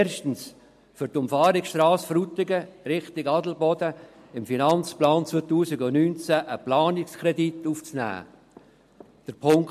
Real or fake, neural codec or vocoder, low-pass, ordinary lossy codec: real; none; 14.4 kHz; MP3, 64 kbps